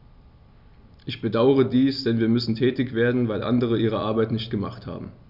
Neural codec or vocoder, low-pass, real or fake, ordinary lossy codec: none; 5.4 kHz; real; none